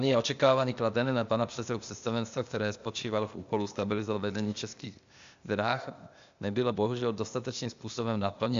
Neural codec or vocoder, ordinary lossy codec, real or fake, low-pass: codec, 16 kHz, 0.8 kbps, ZipCodec; MP3, 64 kbps; fake; 7.2 kHz